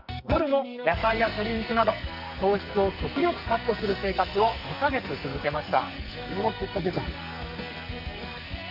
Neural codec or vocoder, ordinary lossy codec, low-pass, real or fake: codec, 44.1 kHz, 2.6 kbps, SNAC; none; 5.4 kHz; fake